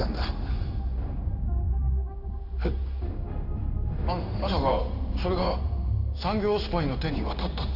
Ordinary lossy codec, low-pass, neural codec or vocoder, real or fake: none; 5.4 kHz; codec, 16 kHz in and 24 kHz out, 1 kbps, XY-Tokenizer; fake